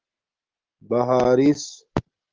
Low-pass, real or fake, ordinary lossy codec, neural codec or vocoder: 7.2 kHz; real; Opus, 16 kbps; none